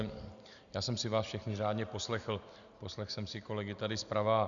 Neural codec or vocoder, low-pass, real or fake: none; 7.2 kHz; real